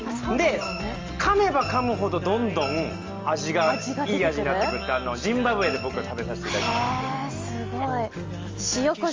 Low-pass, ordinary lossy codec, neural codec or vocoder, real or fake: 7.2 kHz; Opus, 32 kbps; none; real